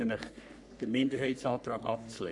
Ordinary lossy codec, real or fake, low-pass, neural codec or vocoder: none; fake; 10.8 kHz; codec, 44.1 kHz, 3.4 kbps, Pupu-Codec